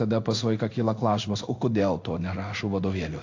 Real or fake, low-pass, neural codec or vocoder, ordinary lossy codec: fake; 7.2 kHz; codec, 16 kHz in and 24 kHz out, 1 kbps, XY-Tokenizer; AAC, 48 kbps